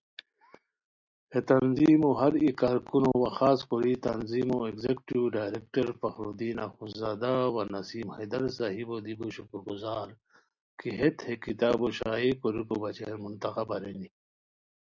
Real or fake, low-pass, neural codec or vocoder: real; 7.2 kHz; none